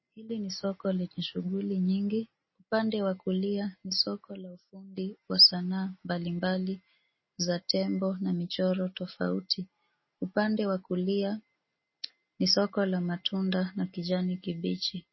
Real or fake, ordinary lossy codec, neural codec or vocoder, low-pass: real; MP3, 24 kbps; none; 7.2 kHz